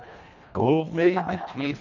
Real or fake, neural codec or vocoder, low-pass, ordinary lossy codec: fake; codec, 24 kHz, 1.5 kbps, HILCodec; 7.2 kHz; none